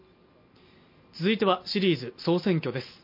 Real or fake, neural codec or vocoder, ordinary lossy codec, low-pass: real; none; MP3, 32 kbps; 5.4 kHz